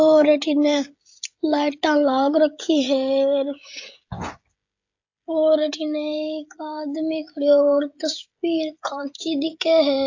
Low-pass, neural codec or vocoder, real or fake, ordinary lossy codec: 7.2 kHz; codec, 16 kHz, 16 kbps, FreqCodec, smaller model; fake; AAC, 48 kbps